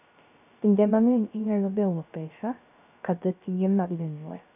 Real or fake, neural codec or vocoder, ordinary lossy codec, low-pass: fake; codec, 16 kHz, 0.3 kbps, FocalCodec; none; 3.6 kHz